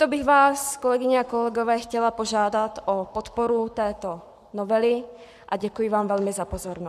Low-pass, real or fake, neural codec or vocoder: 14.4 kHz; fake; codec, 44.1 kHz, 7.8 kbps, Pupu-Codec